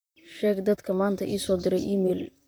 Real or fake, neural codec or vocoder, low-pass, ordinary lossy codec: fake; vocoder, 44.1 kHz, 128 mel bands, Pupu-Vocoder; none; none